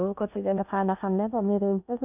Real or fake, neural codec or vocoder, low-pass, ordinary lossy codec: fake; codec, 16 kHz in and 24 kHz out, 0.6 kbps, FocalCodec, streaming, 2048 codes; 3.6 kHz; none